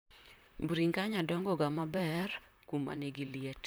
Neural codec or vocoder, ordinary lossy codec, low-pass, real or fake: vocoder, 44.1 kHz, 128 mel bands, Pupu-Vocoder; none; none; fake